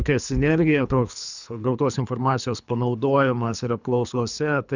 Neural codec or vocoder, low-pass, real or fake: codec, 24 kHz, 3 kbps, HILCodec; 7.2 kHz; fake